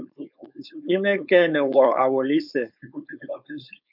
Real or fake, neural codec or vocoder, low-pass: fake; codec, 16 kHz, 4.8 kbps, FACodec; 5.4 kHz